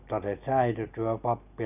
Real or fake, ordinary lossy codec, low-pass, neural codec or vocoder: real; none; 3.6 kHz; none